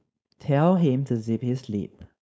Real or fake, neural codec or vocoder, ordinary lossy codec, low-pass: fake; codec, 16 kHz, 4.8 kbps, FACodec; none; none